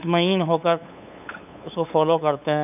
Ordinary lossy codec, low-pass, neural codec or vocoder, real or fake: none; 3.6 kHz; codec, 16 kHz, 8 kbps, FunCodec, trained on LibriTTS, 25 frames a second; fake